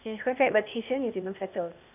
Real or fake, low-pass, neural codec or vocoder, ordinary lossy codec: fake; 3.6 kHz; codec, 16 kHz, 0.8 kbps, ZipCodec; none